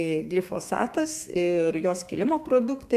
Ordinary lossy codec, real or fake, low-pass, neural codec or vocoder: AAC, 96 kbps; fake; 14.4 kHz; codec, 32 kHz, 1.9 kbps, SNAC